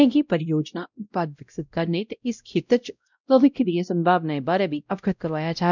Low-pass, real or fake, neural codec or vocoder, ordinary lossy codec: 7.2 kHz; fake; codec, 16 kHz, 0.5 kbps, X-Codec, WavLM features, trained on Multilingual LibriSpeech; none